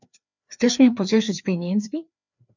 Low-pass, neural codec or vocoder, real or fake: 7.2 kHz; codec, 16 kHz, 2 kbps, FreqCodec, larger model; fake